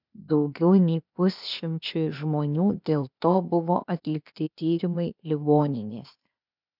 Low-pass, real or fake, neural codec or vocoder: 5.4 kHz; fake; codec, 16 kHz, 0.8 kbps, ZipCodec